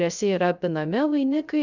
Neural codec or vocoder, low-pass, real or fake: codec, 16 kHz, 0.3 kbps, FocalCodec; 7.2 kHz; fake